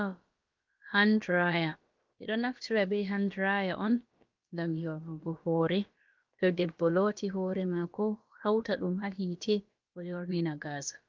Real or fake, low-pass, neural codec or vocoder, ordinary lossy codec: fake; 7.2 kHz; codec, 16 kHz, about 1 kbps, DyCAST, with the encoder's durations; Opus, 24 kbps